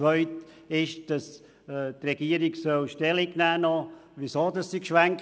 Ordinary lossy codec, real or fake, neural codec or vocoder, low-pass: none; real; none; none